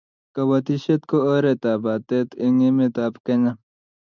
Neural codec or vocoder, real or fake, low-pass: none; real; 7.2 kHz